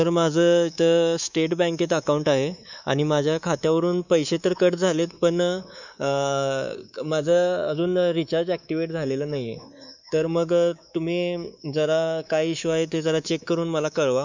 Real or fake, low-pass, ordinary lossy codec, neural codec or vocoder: fake; 7.2 kHz; none; codec, 24 kHz, 3.1 kbps, DualCodec